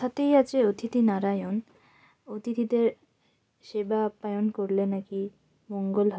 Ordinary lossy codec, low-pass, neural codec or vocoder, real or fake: none; none; none; real